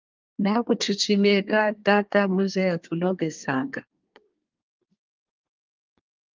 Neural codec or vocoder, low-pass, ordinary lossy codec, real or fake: codec, 24 kHz, 1 kbps, SNAC; 7.2 kHz; Opus, 32 kbps; fake